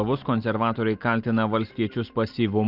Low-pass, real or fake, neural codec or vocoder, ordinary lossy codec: 5.4 kHz; real; none; Opus, 32 kbps